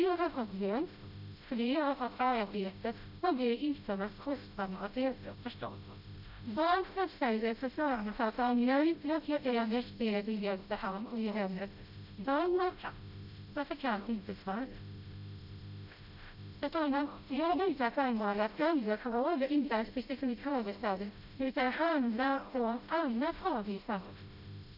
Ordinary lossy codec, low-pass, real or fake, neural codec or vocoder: none; 5.4 kHz; fake; codec, 16 kHz, 0.5 kbps, FreqCodec, smaller model